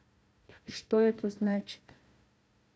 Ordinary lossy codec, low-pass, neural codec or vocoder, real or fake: none; none; codec, 16 kHz, 1 kbps, FunCodec, trained on Chinese and English, 50 frames a second; fake